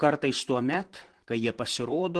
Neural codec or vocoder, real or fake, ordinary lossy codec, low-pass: vocoder, 44.1 kHz, 128 mel bands, Pupu-Vocoder; fake; Opus, 16 kbps; 10.8 kHz